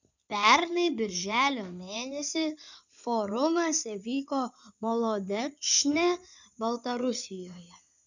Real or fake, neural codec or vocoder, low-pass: fake; codec, 16 kHz in and 24 kHz out, 2.2 kbps, FireRedTTS-2 codec; 7.2 kHz